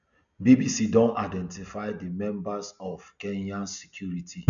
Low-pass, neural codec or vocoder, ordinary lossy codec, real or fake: 7.2 kHz; none; none; real